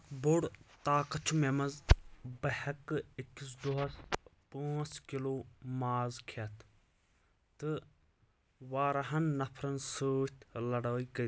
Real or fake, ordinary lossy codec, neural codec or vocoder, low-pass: real; none; none; none